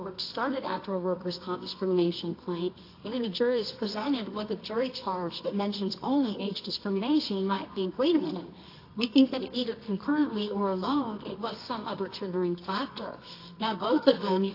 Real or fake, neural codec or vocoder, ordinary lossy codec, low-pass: fake; codec, 24 kHz, 0.9 kbps, WavTokenizer, medium music audio release; AAC, 32 kbps; 5.4 kHz